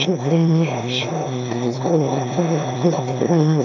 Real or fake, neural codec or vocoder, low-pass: fake; autoencoder, 22.05 kHz, a latent of 192 numbers a frame, VITS, trained on one speaker; 7.2 kHz